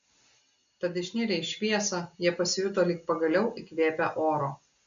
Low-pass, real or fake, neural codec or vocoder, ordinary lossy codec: 7.2 kHz; real; none; MP3, 64 kbps